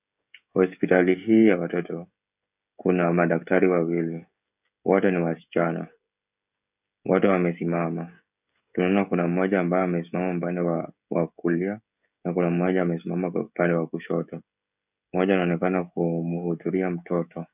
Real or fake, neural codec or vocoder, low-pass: fake; codec, 16 kHz, 16 kbps, FreqCodec, smaller model; 3.6 kHz